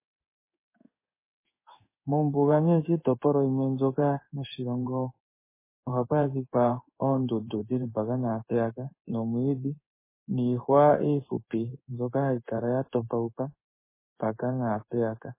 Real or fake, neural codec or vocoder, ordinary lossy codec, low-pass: fake; codec, 16 kHz in and 24 kHz out, 1 kbps, XY-Tokenizer; MP3, 16 kbps; 3.6 kHz